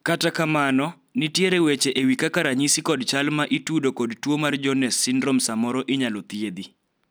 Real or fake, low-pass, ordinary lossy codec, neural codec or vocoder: real; none; none; none